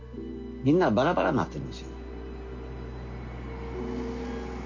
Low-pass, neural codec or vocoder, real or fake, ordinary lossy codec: 7.2 kHz; none; real; none